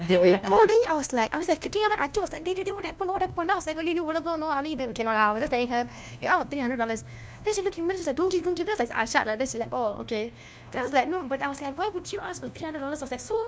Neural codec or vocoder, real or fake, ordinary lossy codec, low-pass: codec, 16 kHz, 1 kbps, FunCodec, trained on LibriTTS, 50 frames a second; fake; none; none